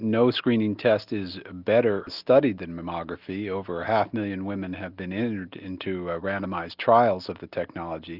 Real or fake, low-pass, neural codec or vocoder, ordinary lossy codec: real; 5.4 kHz; none; Opus, 64 kbps